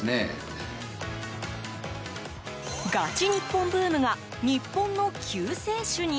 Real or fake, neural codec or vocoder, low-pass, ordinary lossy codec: real; none; none; none